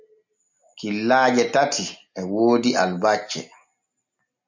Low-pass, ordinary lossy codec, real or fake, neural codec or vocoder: 7.2 kHz; MP3, 48 kbps; real; none